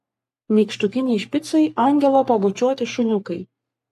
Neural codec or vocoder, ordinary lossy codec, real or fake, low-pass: codec, 44.1 kHz, 3.4 kbps, Pupu-Codec; AAC, 96 kbps; fake; 14.4 kHz